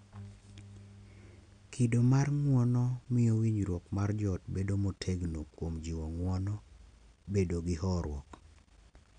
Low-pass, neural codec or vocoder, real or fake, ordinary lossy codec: 9.9 kHz; none; real; none